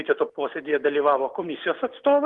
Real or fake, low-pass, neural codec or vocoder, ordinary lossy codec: real; 10.8 kHz; none; Opus, 24 kbps